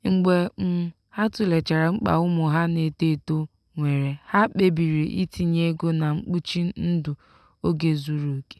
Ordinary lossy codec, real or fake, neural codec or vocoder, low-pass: none; real; none; none